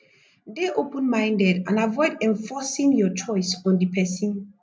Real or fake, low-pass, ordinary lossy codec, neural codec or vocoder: real; none; none; none